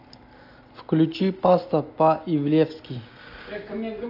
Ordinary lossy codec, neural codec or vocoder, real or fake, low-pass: AAC, 32 kbps; none; real; 5.4 kHz